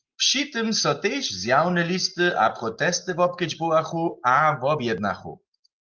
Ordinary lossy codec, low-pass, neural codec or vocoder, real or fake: Opus, 24 kbps; 7.2 kHz; none; real